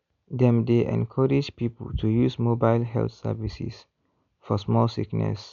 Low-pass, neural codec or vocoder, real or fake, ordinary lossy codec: 7.2 kHz; none; real; none